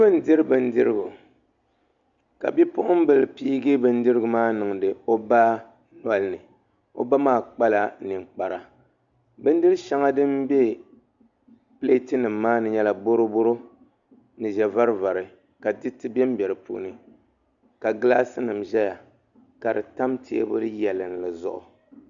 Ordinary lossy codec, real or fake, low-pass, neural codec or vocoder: Opus, 64 kbps; real; 7.2 kHz; none